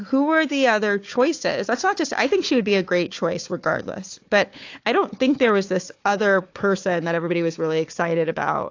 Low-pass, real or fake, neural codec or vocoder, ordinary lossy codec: 7.2 kHz; fake; codec, 16 kHz, 4 kbps, FunCodec, trained on Chinese and English, 50 frames a second; AAC, 48 kbps